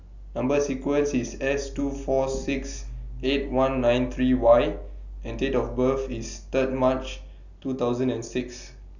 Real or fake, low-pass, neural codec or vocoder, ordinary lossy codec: real; 7.2 kHz; none; none